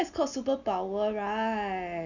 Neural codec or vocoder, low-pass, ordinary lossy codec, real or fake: none; 7.2 kHz; none; real